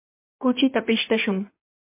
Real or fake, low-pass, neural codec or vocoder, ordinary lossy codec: fake; 3.6 kHz; codec, 16 kHz in and 24 kHz out, 1.1 kbps, FireRedTTS-2 codec; MP3, 24 kbps